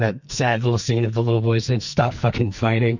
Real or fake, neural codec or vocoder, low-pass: fake; codec, 44.1 kHz, 2.6 kbps, SNAC; 7.2 kHz